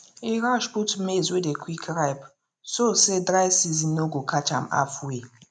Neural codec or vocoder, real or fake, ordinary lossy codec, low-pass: none; real; none; 9.9 kHz